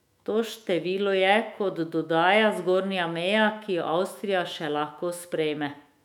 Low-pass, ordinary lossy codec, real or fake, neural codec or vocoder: 19.8 kHz; none; fake; autoencoder, 48 kHz, 128 numbers a frame, DAC-VAE, trained on Japanese speech